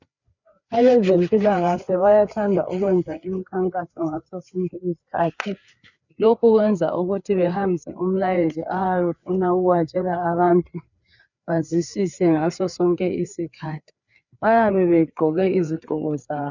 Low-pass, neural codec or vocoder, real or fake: 7.2 kHz; codec, 16 kHz, 4 kbps, FreqCodec, larger model; fake